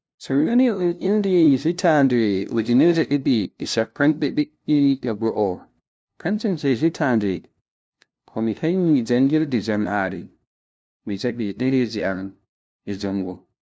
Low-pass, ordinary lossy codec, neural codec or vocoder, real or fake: none; none; codec, 16 kHz, 0.5 kbps, FunCodec, trained on LibriTTS, 25 frames a second; fake